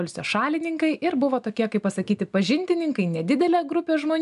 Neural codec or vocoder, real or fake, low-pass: none; real; 10.8 kHz